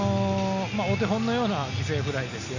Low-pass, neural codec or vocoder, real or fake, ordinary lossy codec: 7.2 kHz; none; real; none